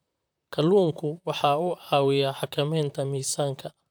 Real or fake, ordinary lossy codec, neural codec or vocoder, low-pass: fake; none; vocoder, 44.1 kHz, 128 mel bands, Pupu-Vocoder; none